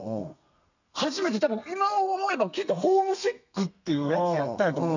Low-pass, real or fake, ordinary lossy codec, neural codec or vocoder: 7.2 kHz; fake; none; codec, 32 kHz, 1.9 kbps, SNAC